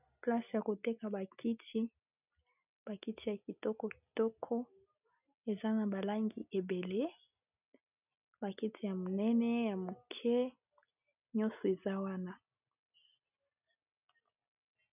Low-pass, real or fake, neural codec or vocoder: 3.6 kHz; real; none